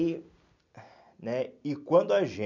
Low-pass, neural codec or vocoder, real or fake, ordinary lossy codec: 7.2 kHz; none; real; none